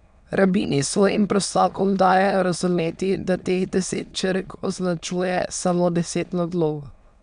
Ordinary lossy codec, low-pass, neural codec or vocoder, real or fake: none; 9.9 kHz; autoencoder, 22.05 kHz, a latent of 192 numbers a frame, VITS, trained on many speakers; fake